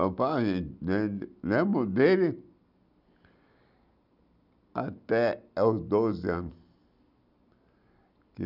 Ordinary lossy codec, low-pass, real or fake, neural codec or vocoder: none; 5.4 kHz; real; none